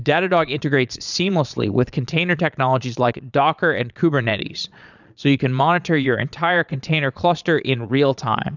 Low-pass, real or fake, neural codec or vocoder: 7.2 kHz; fake; vocoder, 22.05 kHz, 80 mel bands, Vocos